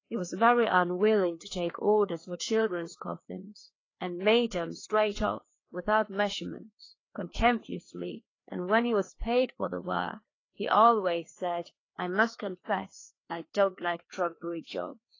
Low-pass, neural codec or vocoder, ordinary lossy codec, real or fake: 7.2 kHz; codec, 16 kHz, 2 kbps, FreqCodec, larger model; AAC, 32 kbps; fake